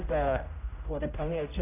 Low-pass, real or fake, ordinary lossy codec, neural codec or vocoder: 3.6 kHz; fake; none; codec, 16 kHz, 0.5 kbps, X-Codec, HuBERT features, trained on general audio